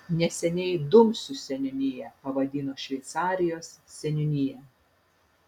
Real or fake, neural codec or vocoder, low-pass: real; none; 19.8 kHz